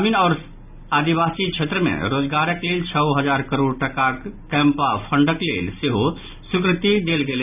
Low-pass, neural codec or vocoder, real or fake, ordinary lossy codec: 3.6 kHz; none; real; none